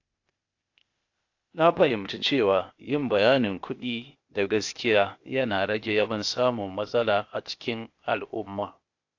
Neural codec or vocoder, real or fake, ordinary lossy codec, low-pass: codec, 16 kHz, 0.8 kbps, ZipCodec; fake; AAC, 48 kbps; 7.2 kHz